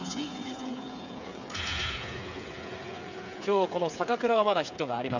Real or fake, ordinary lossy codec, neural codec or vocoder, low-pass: fake; none; codec, 16 kHz, 8 kbps, FreqCodec, smaller model; 7.2 kHz